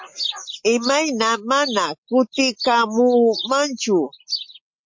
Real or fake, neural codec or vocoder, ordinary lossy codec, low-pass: real; none; MP3, 48 kbps; 7.2 kHz